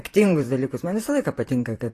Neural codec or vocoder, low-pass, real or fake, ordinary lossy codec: vocoder, 44.1 kHz, 128 mel bands, Pupu-Vocoder; 14.4 kHz; fake; AAC, 48 kbps